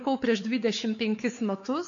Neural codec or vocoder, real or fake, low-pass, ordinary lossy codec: codec, 16 kHz, 4 kbps, X-Codec, WavLM features, trained on Multilingual LibriSpeech; fake; 7.2 kHz; AAC, 64 kbps